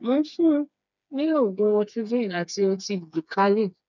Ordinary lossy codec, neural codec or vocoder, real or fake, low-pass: none; codec, 16 kHz, 4 kbps, FreqCodec, smaller model; fake; 7.2 kHz